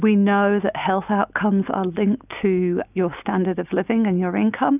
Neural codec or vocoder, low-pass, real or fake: none; 3.6 kHz; real